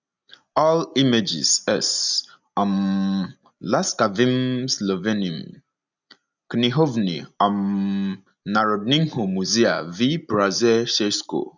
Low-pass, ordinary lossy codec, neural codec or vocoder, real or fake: 7.2 kHz; none; none; real